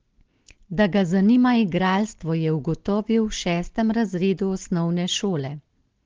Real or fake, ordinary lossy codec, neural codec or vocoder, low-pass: real; Opus, 16 kbps; none; 7.2 kHz